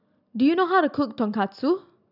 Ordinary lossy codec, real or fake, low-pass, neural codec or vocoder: none; real; 5.4 kHz; none